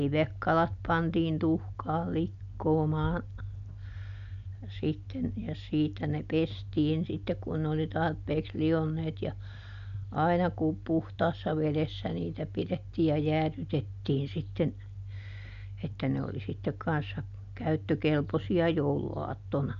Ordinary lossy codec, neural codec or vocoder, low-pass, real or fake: none; none; 7.2 kHz; real